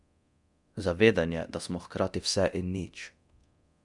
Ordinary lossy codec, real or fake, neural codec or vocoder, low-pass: none; fake; codec, 24 kHz, 0.9 kbps, DualCodec; 10.8 kHz